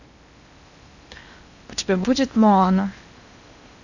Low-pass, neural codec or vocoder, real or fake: 7.2 kHz; codec, 16 kHz in and 24 kHz out, 0.8 kbps, FocalCodec, streaming, 65536 codes; fake